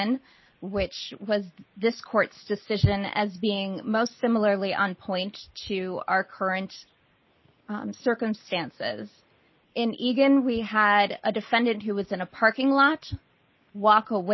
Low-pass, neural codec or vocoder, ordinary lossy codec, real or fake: 7.2 kHz; none; MP3, 24 kbps; real